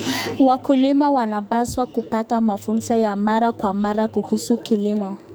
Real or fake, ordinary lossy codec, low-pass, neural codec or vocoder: fake; none; none; codec, 44.1 kHz, 2.6 kbps, SNAC